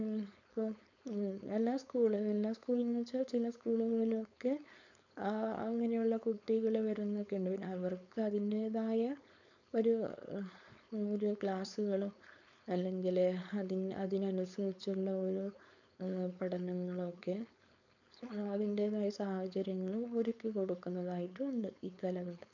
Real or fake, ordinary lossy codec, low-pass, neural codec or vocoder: fake; none; 7.2 kHz; codec, 16 kHz, 4.8 kbps, FACodec